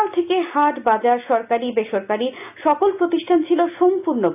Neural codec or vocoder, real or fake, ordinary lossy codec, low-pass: none; real; AAC, 32 kbps; 3.6 kHz